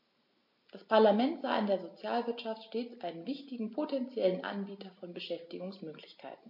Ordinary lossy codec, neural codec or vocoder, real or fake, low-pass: MP3, 32 kbps; none; real; 5.4 kHz